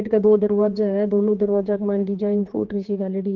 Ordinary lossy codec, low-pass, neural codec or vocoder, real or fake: Opus, 16 kbps; 7.2 kHz; codec, 16 kHz, 4 kbps, X-Codec, HuBERT features, trained on general audio; fake